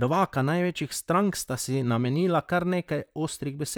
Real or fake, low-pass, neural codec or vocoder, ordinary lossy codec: fake; none; vocoder, 44.1 kHz, 128 mel bands, Pupu-Vocoder; none